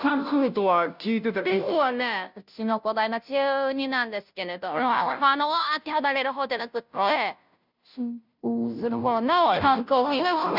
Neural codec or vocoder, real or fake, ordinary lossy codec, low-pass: codec, 16 kHz, 0.5 kbps, FunCodec, trained on Chinese and English, 25 frames a second; fake; none; 5.4 kHz